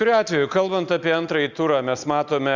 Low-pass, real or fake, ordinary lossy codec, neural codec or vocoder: 7.2 kHz; real; Opus, 64 kbps; none